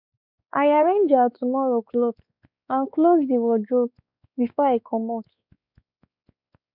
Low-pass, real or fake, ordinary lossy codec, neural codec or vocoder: 5.4 kHz; fake; none; codec, 16 kHz, 4 kbps, X-Codec, WavLM features, trained on Multilingual LibriSpeech